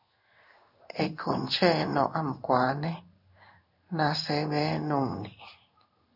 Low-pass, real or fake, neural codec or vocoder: 5.4 kHz; fake; codec, 16 kHz in and 24 kHz out, 1 kbps, XY-Tokenizer